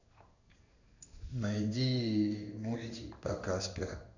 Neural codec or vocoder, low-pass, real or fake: codec, 16 kHz in and 24 kHz out, 1 kbps, XY-Tokenizer; 7.2 kHz; fake